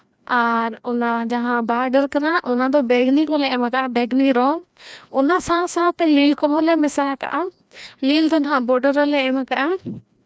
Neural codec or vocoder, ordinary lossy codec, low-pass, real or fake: codec, 16 kHz, 1 kbps, FreqCodec, larger model; none; none; fake